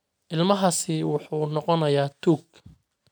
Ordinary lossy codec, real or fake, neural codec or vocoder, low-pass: none; real; none; none